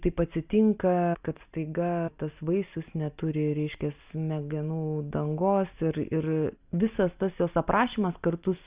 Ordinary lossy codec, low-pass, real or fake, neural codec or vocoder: Opus, 64 kbps; 3.6 kHz; real; none